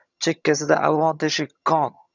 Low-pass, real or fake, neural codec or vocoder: 7.2 kHz; fake; vocoder, 22.05 kHz, 80 mel bands, HiFi-GAN